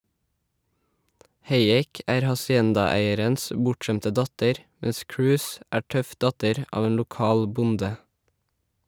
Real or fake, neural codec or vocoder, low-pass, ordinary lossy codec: real; none; none; none